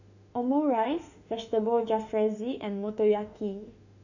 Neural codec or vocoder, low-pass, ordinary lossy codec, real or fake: autoencoder, 48 kHz, 32 numbers a frame, DAC-VAE, trained on Japanese speech; 7.2 kHz; none; fake